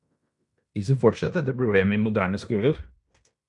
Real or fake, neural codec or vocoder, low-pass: fake; codec, 16 kHz in and 24 kHz out, 0.9 kbps, LongCat-Audio-Codec, fine tuned four codebook decoder; 10.8 kHz